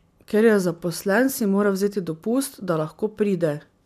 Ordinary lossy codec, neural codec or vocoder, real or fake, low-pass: none; none; real; 14.4 kHz